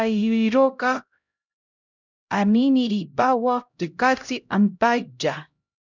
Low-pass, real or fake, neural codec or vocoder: 7.2 kHz; fake; codec, 16 kHz, 0.5 kbps, X-Codec, HuBERT features, trained on LibriSpeech